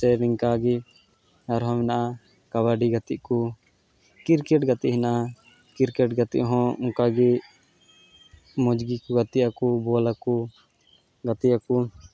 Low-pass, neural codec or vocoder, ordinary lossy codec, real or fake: none; none; none; real